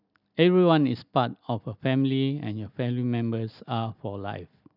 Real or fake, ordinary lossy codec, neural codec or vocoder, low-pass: real; none; none; 5.4 kHz